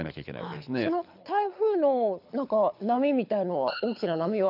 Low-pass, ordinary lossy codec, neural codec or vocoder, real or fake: 5.4 kHz; none; codec, 24 kHz, 6 kbps, HILCodec; fake